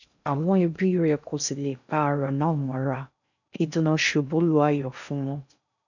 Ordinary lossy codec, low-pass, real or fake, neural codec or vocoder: none; 7.2 kHz; fake; codec, 16 kHz in and 24 kHz out, 0.6 kbps, FocalCodec, streaming, 2048 codes